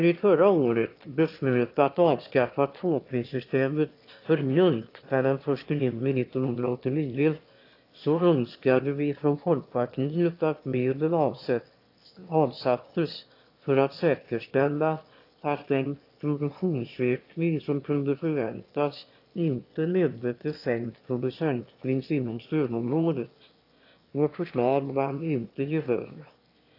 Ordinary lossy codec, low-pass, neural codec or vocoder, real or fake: AAC, 32 kbps; 5.4 kHz; autoencoder, 22.05 kHz, a latent of 192 numbers a frame, VITS, trained on one speaker; fake